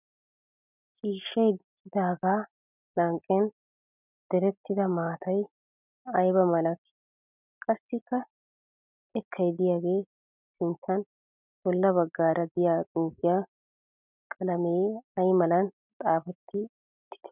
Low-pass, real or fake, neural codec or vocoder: 3.6 kHz; real; none